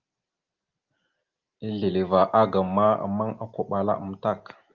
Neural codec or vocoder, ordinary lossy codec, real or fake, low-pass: none; Opus, 32 kbps; real; 7.2 kHz